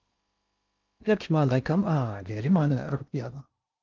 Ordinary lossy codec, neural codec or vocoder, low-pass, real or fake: Opus, 32 kbps; codec, 16 kHz in and 24 kHz out, 0.6 kbps, FocalCodec, streaming, 2048 codes; 7.2 kHz; fake